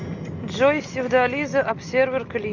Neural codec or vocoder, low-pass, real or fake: none; 7.2 kHz; real